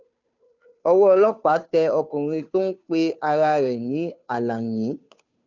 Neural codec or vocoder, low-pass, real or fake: codec, 16 kHz, 2 kbps, FunCodec, trained on Chinese and English, 25 frames a second; 7.2 kHz; fake